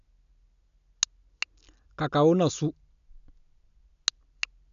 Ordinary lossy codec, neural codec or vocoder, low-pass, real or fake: none; none; 7.2 kHz; real